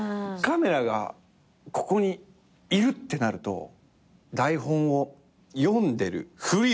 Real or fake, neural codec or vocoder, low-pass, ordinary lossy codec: real; none; none; none